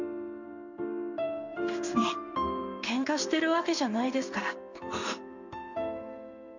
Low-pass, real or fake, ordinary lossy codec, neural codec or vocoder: 7.2 kHz; fake; none; codec, 16 kHz in and 24 kHz out, 1 kbps, XY-Tokenizer